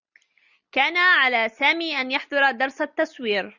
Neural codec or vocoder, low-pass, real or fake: none; 7.2 kHz; real